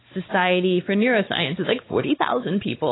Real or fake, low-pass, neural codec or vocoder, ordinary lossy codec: fake; 7.2 kHz; codec, 16 kHz, 4 kbps, X-Codec, WavLM features, trained on Multilingual LibriSpeech; AAC, 16 kbps